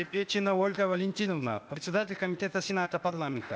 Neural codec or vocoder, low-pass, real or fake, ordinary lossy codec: codec, 16 kHz, 0.8 kbps, ZipCodec; none; fake; none